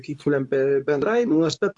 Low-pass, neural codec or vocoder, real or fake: 10.8 kHz; codec, 24 kHz, 0.9 kbps, WavTokenizer, medium speech release version 2; fake